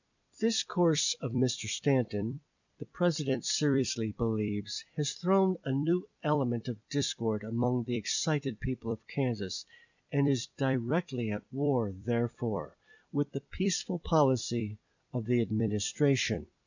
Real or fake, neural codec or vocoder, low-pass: fake; vocoder, 44.1 kHz, 128 mel bands every 256 samples, BigVGAN v2; 7.2 kHz